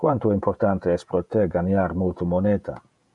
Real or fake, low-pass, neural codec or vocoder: fake; 10.8 kHz; vocoder, 48 kHz, 128 mel bands, Vocos